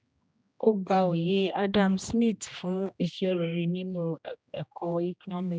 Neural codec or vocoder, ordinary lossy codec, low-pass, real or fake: codec, 16 kHz, 1 kbps, X-Codec, HuBERT features, trained on general audio; none; none; fake